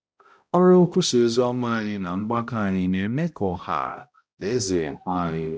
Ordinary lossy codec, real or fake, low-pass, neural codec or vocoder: none; fake; none; codec, 16 kHz, 0.5 kbps, X-Codec, HuBERT features, trained on balanced general audio